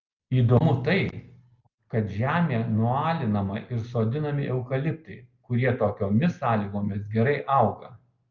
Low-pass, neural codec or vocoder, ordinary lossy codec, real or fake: 7.2 kHz; none; Opus, 24 kbps; real